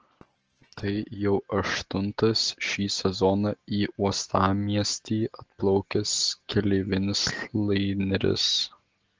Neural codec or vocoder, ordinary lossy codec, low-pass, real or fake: none; Opus, 16 kbps; 7.2 kHz; real